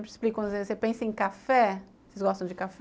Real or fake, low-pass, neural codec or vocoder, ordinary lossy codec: real; none; none; none